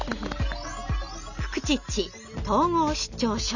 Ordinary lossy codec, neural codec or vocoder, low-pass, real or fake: none; none; 7.2 kHz; real